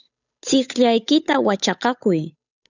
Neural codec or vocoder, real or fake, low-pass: codec, 16 kHz, 8 kbps, FunCodec, trained on Chinese and English, 25 frames a second; fake; 7.2 kHz